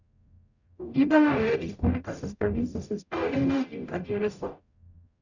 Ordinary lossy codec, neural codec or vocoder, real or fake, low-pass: none; codec, 44.1 kHz, 0.9 kbps, DAC; fake; 7.2 kHz